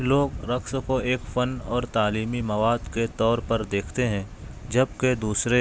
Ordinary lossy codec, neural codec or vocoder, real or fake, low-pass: none; none; real; none